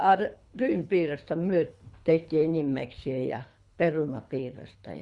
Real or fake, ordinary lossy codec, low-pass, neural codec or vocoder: fake; Opus, 64 kbps; 10.8 kHz; codec, 24 kHz, 3 kbps, HILCodec